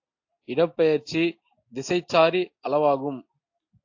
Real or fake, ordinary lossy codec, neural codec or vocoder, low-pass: real; AAC, 48 kbps; none; 7.2 kHz